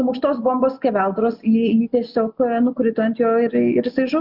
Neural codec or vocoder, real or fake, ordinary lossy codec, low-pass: none; real; Opus, 64 kbps; 5.4 kHz